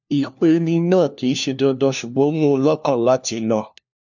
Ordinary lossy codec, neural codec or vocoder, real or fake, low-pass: none; codec, 16 kHz, 1 kbps, FunCodec, trained on LibriTTS, 50 frames a second; fake; 7.2 kHz